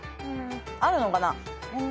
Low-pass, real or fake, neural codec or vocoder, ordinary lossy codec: none; real; none; none